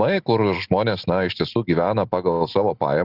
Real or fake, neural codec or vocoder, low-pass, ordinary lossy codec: real; none; 5.4 kHz; Opus, 64 kbps